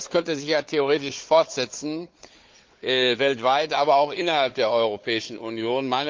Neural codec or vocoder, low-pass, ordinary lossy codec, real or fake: codec, 16 kHz, 4 kbps, FunCodec, trained on Chinese and English, 50 frames a second; 7.2 kHz; Opus, 32 kbps; fake